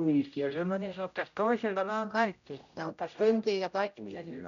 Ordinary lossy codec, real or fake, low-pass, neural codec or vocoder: none; fake; 7.2 kHz; codec, 16 kHz, 0.5 kbps, X-Codec, HuBERT features, trained on general audio